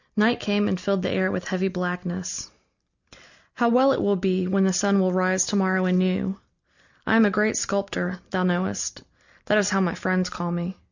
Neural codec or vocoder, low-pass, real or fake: none; 7.2 kHz; real